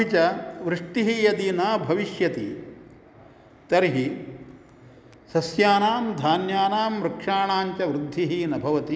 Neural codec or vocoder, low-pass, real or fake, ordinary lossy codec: none; none; real; none